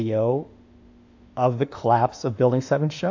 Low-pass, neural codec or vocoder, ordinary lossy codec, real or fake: 7.2 kHz; autoencoder, 48 kHz, 32 numbers a frame, DAC-VAE, trained on Japanese speech; AAC, 48 kbps; fake